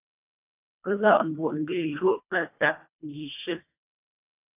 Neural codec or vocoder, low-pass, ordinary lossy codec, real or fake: codec, 24 kHz, 1.5 kbps, HILCodec; 3.6 kHz; AAC, 24 kbps; fake